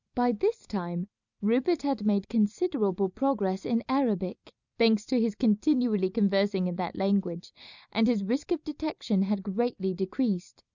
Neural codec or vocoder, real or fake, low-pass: none; real; 7.2 kHz